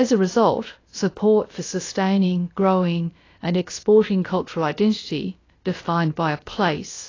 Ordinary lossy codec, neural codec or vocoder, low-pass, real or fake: AAC, 32 kbps; codec, 16 kHz, about 1 kbps, DyCAST, with the encoder's durations; 7.2 kHz; fake